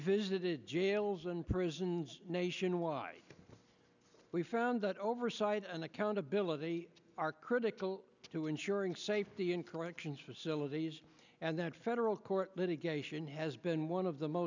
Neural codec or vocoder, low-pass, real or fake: none; 7.2 kHz; real